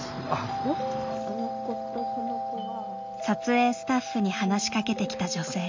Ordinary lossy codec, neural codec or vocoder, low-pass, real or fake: MP3, 64 kbps; none; 7.2 kHz; real